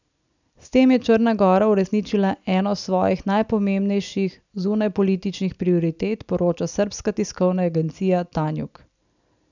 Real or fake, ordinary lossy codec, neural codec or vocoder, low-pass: real; none; none; 7.2 kHz